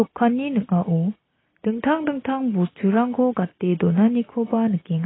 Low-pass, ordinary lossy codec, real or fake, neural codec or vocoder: 7.2 kHz; AAC, 16 kbps; real; none